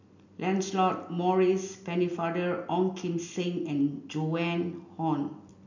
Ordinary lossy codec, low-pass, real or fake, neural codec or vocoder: none; 7.2 kHz; real; none